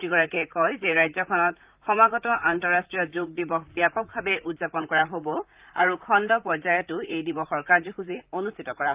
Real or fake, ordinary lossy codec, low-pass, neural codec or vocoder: fake; Opus, 32 kbps; 3.6 kHz; vocoder, 44.1 kHz, 128 mel bands, Pupu-Vocoder